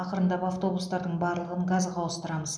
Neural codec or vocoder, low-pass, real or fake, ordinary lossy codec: none; none; real; none